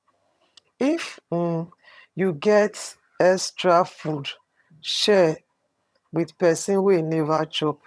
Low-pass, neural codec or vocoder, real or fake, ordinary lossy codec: none; vocoder, 22.05 kHz, 80 mel bands, HiFi-GAN; fake; none